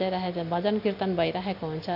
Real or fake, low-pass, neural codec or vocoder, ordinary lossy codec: real; 5.4 kHz; none; AAC, 48 kbps